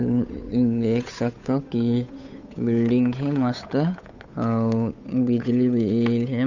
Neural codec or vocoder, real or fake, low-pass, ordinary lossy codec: codec, 16 kHz, 8 kbps, FunCodec, trained on Chinese and English, 25 frames a second; fake; 7.2 kHz; none